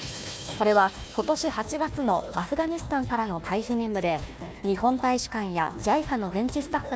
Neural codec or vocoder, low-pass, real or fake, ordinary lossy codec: codec, 16 kHz, 1 kbps, FunCodec, trained on Chinese and English, 50 frames a second; none; fake; none